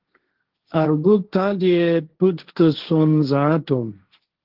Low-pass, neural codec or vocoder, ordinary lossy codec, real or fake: 5.4 kHz; codec, 16 kHz, 1.1 kbps, Voila-Tokenizer; Opus, 16 kbps; fake